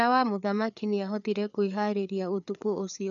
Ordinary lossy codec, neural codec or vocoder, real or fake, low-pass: none; codec, 16 kHz, 4 kbps, FreqCodec, larger model; fake; 7.2 kHz